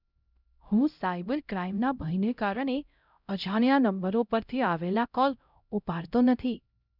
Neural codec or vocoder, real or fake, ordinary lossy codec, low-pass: codec, 16 kHz, 0.5 kbps, X-Codec, HuBERT features, trained on LibriSpeech; fake; none; 5.4 kHz